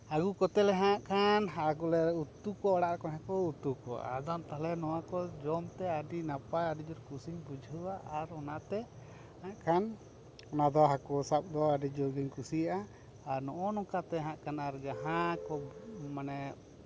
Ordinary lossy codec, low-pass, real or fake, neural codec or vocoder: none; none; real; none